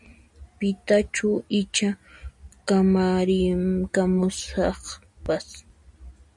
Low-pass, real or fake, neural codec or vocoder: 10.8 kHz; real; none